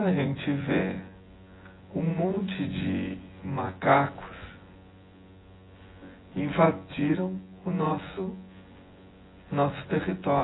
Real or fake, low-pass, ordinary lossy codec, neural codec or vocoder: fake; 7.2 kHz; AAC, 16 kbps; vocoder, 24 kHz, 100 mel bands, Vocos